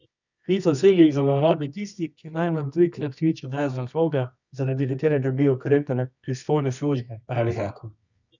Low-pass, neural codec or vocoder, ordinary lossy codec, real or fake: 7.2 kHz; codec, 24 kHz, 0.9 kbps, WavTokenizer, medium music audio release; none; fake